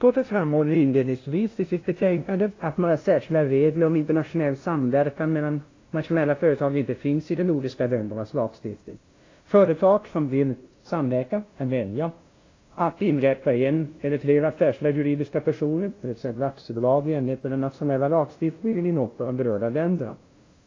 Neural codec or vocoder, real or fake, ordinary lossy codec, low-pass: codec, 16 kHz, 0.5 kbps, FunCodec, trained on LibriTTS, 25 frames a second; fake; AAC, 32 kbps; 7.2 kHz